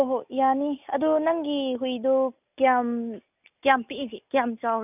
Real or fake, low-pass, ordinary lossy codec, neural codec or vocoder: real; 3.6 kHz; none; none